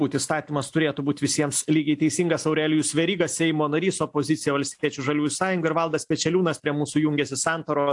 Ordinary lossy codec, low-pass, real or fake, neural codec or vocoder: AAC, 64 kbps; 10.8 kHz; real; none